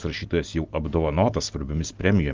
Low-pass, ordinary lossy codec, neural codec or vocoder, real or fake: 7.2 kHz; Opus, 32 kbps; none; real